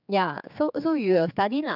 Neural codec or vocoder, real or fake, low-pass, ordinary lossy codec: codec, 16 kHz, 4 kbps, X-Codec, HuBERT features, trained on general audio; fake; 5.4 kHz; none